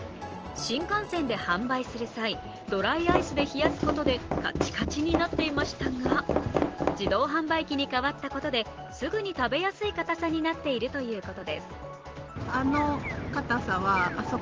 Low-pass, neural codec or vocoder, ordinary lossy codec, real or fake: 7.2 kHz; none; Opus, 16 kbps; real